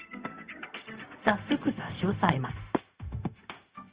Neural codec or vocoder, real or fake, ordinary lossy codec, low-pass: codec, 16 kHz, 0.4 kbps, LongCat-Audio-Codec; fake; Opus, 24 kbps; 3.6 kHz